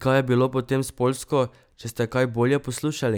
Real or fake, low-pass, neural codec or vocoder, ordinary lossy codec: real; none; none; none